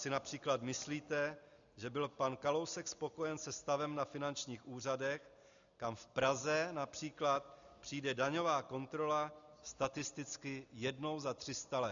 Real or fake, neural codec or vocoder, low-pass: real; none; 7.2 kHz